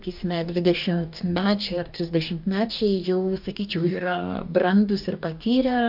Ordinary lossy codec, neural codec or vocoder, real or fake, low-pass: MP3, 48 kbps; codec, 44.1 kHz, 2.6 kbps, DAC; fake; 5.4 kHz